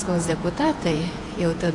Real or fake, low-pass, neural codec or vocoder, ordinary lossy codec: fake; 10.8 kHz; vocoder, 48 kHz, 128 mel bands, Vocos; AAC, 48 kbps